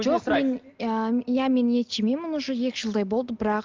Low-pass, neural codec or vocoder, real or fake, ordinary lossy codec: 7.2 kHz; none; real; Opus, 32 kbps